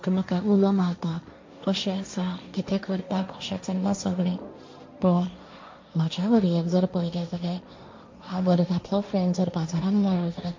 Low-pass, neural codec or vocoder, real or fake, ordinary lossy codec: 7.2 kHz; codec, 16 kHz, 1.1 kbps, Voila-Tokenizer; fake; MP3, 48 kbps